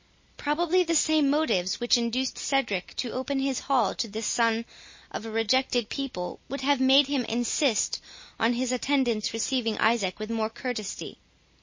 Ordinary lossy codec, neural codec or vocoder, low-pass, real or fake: MP3, 32 kbps; none; 7.2 kHz; real